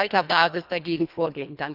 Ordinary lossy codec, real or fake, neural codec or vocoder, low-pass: none; fake; codec, 24 kHz, 1.5 kbps, HILCodec; 5.4 kHz